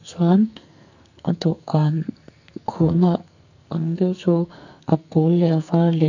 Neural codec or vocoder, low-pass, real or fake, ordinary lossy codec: codec, 44.1 kHz, 2.6 kbps, SNAC; 7.2 kHz; fake; none